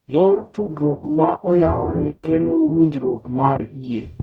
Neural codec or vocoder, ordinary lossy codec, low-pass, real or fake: codec, 44.1 kHz, 0.9 kbps, DAC; Opus, 64 kbps; 19.8 kHz; fake